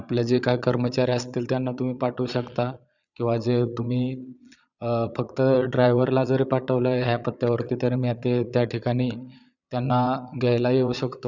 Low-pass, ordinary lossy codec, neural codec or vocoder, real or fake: 7.2 kHz; none; vocoder, 22.05 kHz, 80 mel bands, Vocos; fake